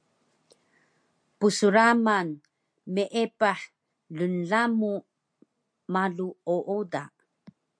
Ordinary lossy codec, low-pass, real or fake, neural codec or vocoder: MP3, 64 kbps; 9.9 kHz; real; none